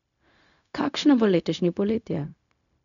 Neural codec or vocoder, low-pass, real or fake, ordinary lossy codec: codec, 16 kHz, 0.4 kbps, LongCat-Audio-Codec; 7.2 kHz; fake; none